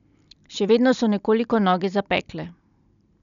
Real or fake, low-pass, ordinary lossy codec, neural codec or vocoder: real; 7.2 kHz; none; none